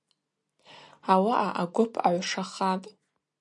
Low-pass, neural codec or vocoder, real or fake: 10.8 kHz; none; real